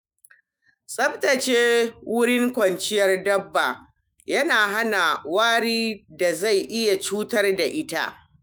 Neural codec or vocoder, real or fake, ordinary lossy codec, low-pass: autoencoder, 48 kHz, 128 numbers a frame, DAC-VAE, trained on Japanese speech; fake; none; none